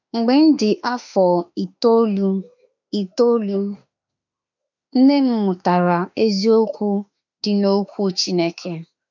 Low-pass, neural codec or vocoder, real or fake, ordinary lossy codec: 7.2 kHz; autoencoder, 48 kHz, 32 numbers a frame, DAC-VAE, trained on Japanese speech; fake; none